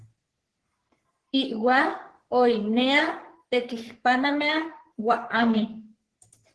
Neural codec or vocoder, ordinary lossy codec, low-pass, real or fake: codec, 44.1 kHz, 3.4 kbps, Pupu-Codec; Opus, 16 kbps; 10.8 kHz; fake